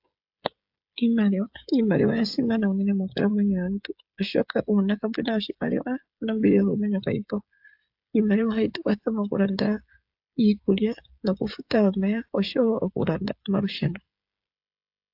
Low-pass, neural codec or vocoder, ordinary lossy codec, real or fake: 5.4 kHz; codec, 16 kHz, 16 kbps, FreqCodec, smaller model; AAC, 48 kbps; fake